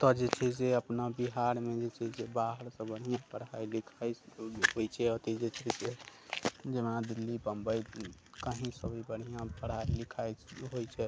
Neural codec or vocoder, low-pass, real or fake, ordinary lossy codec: none; none; real; none